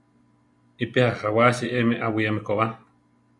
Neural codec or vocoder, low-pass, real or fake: none; 10.8 kHz; real